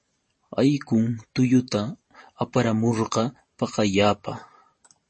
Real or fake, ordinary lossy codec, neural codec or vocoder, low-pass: fake; MP3, 32 kbps; vocoder, 44.1 kHz, 128 mel bands every 512 samples, BigVGAN v2; 10.8 kHz